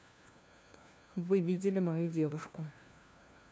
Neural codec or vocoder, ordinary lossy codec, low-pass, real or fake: codec, 16 kHz, 1 kbps, FunCodec, trained on LibriTTS, 50 frames a second; none; none; fake